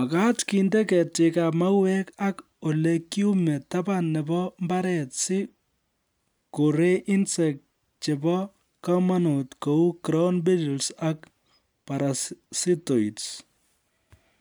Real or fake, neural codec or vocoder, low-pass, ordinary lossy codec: real; none; none; none